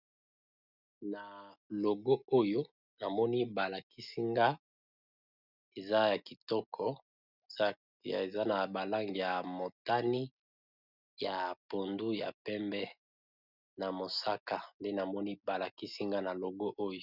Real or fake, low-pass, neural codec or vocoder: real; 5.4 kHz; none